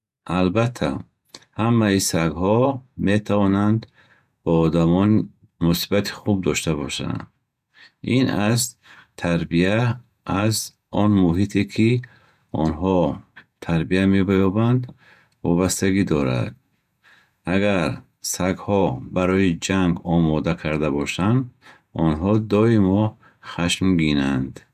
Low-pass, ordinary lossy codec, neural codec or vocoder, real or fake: 14.4 kHz; none; vocoder, 48 kHz, 128 mel bands, Vocos; fake